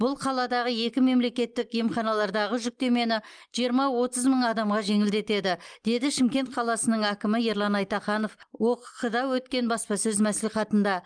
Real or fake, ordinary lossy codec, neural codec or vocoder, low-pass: real; Opus, 32 kbps; none; 9.9 kHz